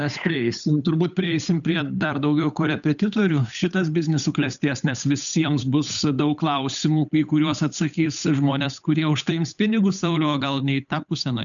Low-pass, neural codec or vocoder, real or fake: 7.2 kHz; codec, 16 kHz, 8 kbps, FunCodec, trained on Chinese and English, 25 frames a second; fake